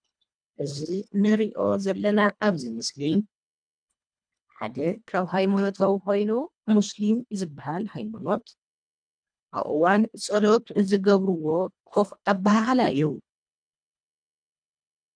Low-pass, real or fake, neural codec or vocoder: 9.9 kHz; fake; codec, 24 kHz, 1.5 kbps, HILCodec